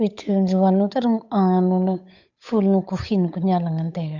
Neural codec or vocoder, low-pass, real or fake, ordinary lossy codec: codec, 16 kHz, 8 kbps, FunCodec, trained on Chinese and English, 25 frames a second; 7.2 kHz; fake; none